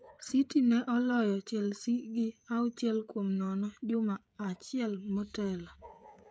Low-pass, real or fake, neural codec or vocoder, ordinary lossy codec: none; fake; codec, 16 kHz, 16 kbps, FreqCodec, smaller model; none